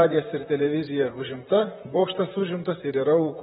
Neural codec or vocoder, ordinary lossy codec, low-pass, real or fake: vocoder, 44.1 kHz, 128 mel bands, Pupu-Vocoder; AAC, 16 kbps; 19.8 kHz; fake